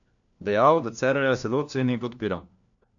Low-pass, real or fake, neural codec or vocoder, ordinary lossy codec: 7.2 kHz; fake; codec, 16 kHz, 1 kbps, FunCodec, trained on LibriTTS, 50 frames a second; none